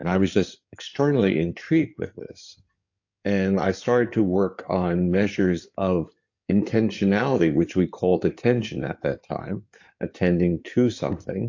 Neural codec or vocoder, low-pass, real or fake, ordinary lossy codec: codec, 16 kHz in and 24 kHz out, 2.2 kbps, FireRedTTS-2 codec; 7.2 kHz; fake; AAC, 48 kbps